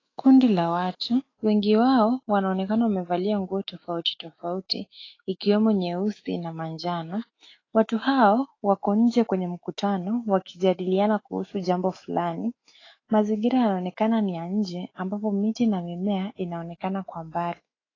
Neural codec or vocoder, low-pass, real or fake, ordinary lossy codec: autoencoder, 48 kHz, 128 numbers a frame, DAC-VAE, trained on Japanese speech; 7.2 kHz; fake; AAC, 32 kbps